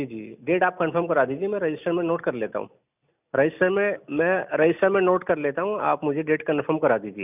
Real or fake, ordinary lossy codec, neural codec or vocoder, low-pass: real; none; none; 3.6 kHz